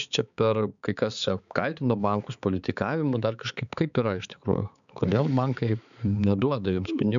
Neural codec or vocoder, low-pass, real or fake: codec, 16 kHz, 4 kbps, X-Codec, HuBERT features, trained on balanced general audio; 7.2 kHz; fake